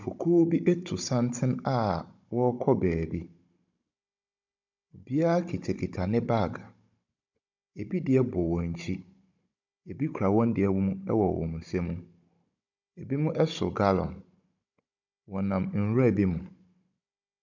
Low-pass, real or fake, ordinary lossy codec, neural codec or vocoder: 7.2 kHz; fake; MP3, 64 kbps; codec, 16 kHz, 16 kbps, FunCodec, trained on Chinese and English, 50 frames a second